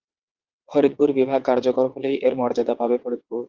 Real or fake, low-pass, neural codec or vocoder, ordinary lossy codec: fake; 7.2 kHz; vocoder, 22.05 kHz, 80 mel bands, WaveNeXt; Opus, 32 kbps